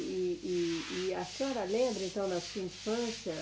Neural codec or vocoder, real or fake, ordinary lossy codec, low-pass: none; real; none; none